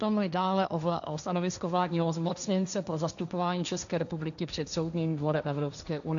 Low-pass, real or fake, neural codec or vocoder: 7.2 kHz; fake; codec, 16 kHz, 1.1 kbps, Voila-Tokenizer